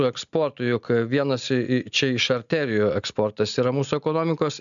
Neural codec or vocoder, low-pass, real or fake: none; 7.2 kHz; real